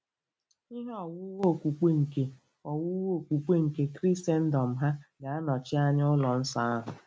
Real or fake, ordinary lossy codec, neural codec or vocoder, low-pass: real; none; none; none